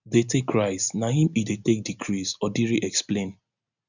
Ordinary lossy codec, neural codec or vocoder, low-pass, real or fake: none; none; 7.2 kHz; real